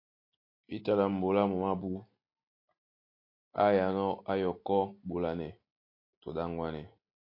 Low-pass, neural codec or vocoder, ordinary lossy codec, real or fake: 5.4 kHz; none; MP3, 32 kbps; real